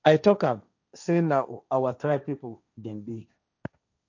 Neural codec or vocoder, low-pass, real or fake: codec, 16 kHz, 1.1 kbps, Voila-Tokenizer; 7.2 kHz; fake